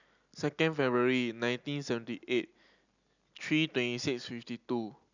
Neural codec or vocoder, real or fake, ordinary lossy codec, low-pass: none; real; none; 7.2 kHz